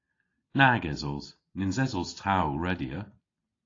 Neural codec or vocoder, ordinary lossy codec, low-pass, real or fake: none; AAC, 32 kbps; 7.2 kHz; real